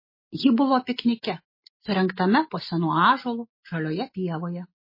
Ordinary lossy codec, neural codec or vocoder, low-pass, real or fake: MP3, 24 kbps; none; 5.4 kHz; real